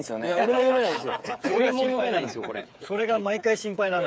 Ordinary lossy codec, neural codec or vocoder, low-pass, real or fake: none; codec, 16 kHz, 8 kbps, FreqCodec, smaller model; none; fake